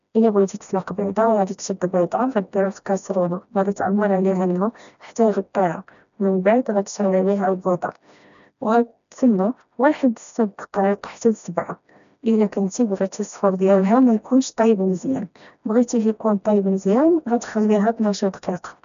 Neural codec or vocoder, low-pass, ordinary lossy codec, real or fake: codec, 16 kHz, 1 kbps, FreqCodec, smaller model; 7.2 kHz; none; fake